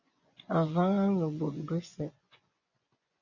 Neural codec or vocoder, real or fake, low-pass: vocoder, 24 kHz, 100 mel bands, Vocos; fake; 7.2 kHz